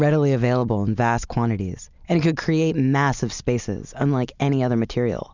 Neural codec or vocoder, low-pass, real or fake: none; 7.2 kHz; real